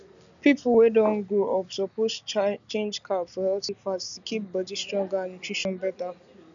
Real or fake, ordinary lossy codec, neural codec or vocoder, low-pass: real; none; none; 7.2 kHz